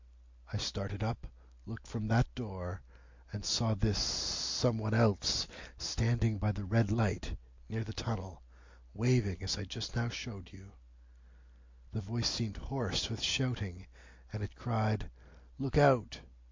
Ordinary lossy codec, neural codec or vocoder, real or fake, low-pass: MP3, 48 kbps; none; real; 7.2 kHz